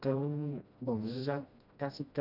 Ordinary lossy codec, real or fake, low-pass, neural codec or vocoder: none; fake; 5.4 kHz; codec, 16 kHz, 1 kbps, FreqCodec, smaller model